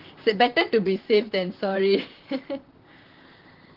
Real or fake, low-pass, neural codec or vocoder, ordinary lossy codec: fake; 5.4 kHz; vocoder, 44.1 kHz, 128 mel bands, Pupu-Vocoder; Opus, 16 kbps